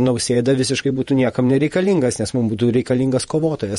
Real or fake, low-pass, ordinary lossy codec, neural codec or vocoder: fake; 19.8 kHz; MP3, 48 kbps; vocoder, 48 kHz, 128 mel bands, Vocos